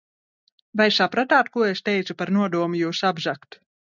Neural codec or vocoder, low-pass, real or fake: none; 7.2 kHz; real